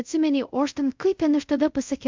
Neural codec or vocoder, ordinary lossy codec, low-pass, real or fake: codec, 24 kHz, 0.5 kbps, DualCodec; MP3, 64 kbps; 7.2 kHz; fake